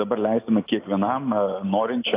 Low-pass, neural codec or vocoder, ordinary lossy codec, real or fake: 3.6 kHz; none; AAC, 24 kbps; real